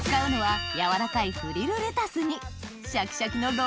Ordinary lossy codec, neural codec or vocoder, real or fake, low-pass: none; none; real; none